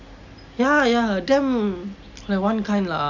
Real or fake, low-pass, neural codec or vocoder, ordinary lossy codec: real; 7.2 kHz; none; none